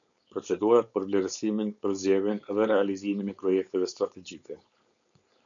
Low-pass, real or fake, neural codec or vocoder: 7.2 kHz; fake; codec, 16 kHz, 4.8 kbps, FACodec